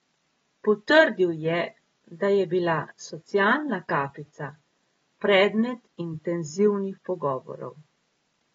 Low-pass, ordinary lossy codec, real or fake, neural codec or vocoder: 9.9 kHz; AAC, 24 kbps; real; none